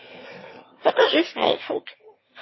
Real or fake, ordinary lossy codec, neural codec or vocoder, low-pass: fake; MP3, 24 kbps; autoencoder, 22.05 kHz, a latent of 192 numbers a frame, VITS, trained on one speaker; 7.2 kHz